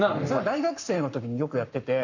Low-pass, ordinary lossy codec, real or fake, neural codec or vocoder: 7.2 kHz; none; fake; codec, 44.1 kHz, 7.8 kbps, Pupu-Codec